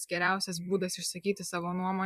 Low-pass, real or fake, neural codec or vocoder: 14.4 kHz; fake; vocoder, 44.1 kHz, 128 mel bands every 256 samples, BigVGAN v2